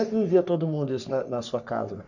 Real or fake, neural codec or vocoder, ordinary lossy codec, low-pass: fake; codec, 44.1 kHz, 3.4 kbps, Pupu-Codec; none; 7.2 kHz